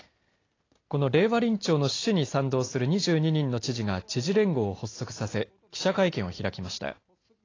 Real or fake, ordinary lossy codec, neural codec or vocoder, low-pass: real; AAC, 32 kbps; none; 7.2 kHz